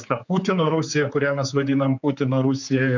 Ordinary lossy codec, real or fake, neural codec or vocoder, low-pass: MP3, 64 kbps; fake; codec, 16 kHz, 4 kbps, X-Codec, HuBERT features, trained on general audio; 7.2 kHz